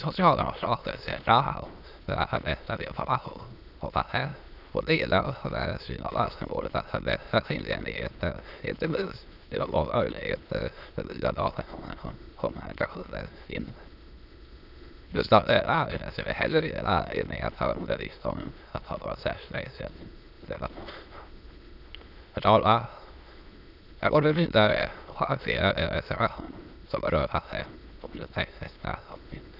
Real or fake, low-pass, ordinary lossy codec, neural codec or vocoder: fake; 5.4 kHz; none; autoencoder, 22.05 kHz, a latent of 192 numbers a frame, VITS, trained on many speakers